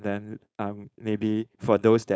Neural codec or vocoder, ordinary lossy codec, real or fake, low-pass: codec, 16 kHz, 4.8 kbps, FACodec; none; fake; none